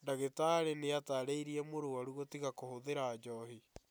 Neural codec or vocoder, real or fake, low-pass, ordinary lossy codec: none; real; none; none